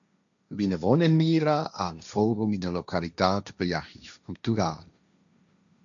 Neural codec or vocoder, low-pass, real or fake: codec, 16 kHz, 1.1 kbps, Voila-Tokenizer; 7.2 kHz; fake